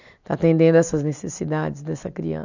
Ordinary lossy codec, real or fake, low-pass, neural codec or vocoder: none; real; 7.2 kHz; none